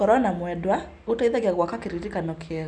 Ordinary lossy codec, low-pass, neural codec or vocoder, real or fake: none; none; none; real